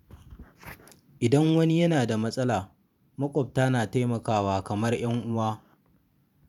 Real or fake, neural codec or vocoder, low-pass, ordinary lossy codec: fake; vocoder, 44.1 kHz, 128 mel bands every 512 samples, BigVGAN v2; 19.8 kHz; none